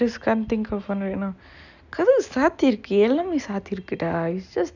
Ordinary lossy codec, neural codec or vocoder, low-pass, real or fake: none; none; 7.2 kHz; real